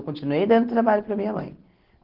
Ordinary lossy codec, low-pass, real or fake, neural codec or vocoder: Opus, 16 kbps; 5.4 kHz; real; none